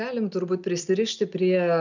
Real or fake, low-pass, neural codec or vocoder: real; 7.2 kHz; none